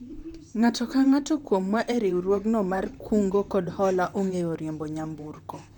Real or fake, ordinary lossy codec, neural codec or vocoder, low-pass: fake; none; vocoder, 44.1 kHz, 128 mel bands, Pupu-Vocoder; 19.8 kHz